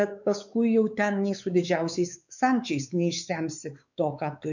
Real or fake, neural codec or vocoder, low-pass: fake; codec, 16 kHz, 4 kbps, X-Codec, WavLM features, trained on Multilingual LibriSpeech; 7.2 kHz